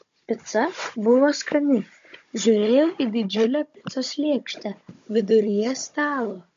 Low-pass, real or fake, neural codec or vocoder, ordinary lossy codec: 7.2 kHz; fake; codec, 16 kHz, 16 kbps, FunCodec, trained on Chinese and English, 50 frames a second; MP3, 48 kbps